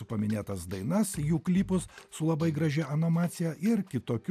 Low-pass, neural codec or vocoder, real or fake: 14.4 kHz; none; real